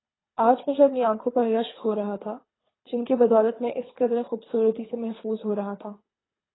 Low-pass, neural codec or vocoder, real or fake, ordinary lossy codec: 7.2 kHz; codec, 24 kHz, 3 kbps, HILCodec; fake; AAC, 16 kbps